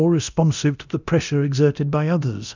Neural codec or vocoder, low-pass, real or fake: codec, 24 kHz, 0.9 kbps, DualCodec; 7.2 kHz; fake